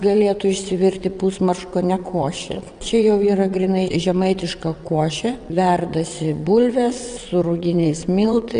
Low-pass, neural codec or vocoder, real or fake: 9.9 kHz; vocoder, 22.05 kHz, 80 mel bands, WaveNeXt; fake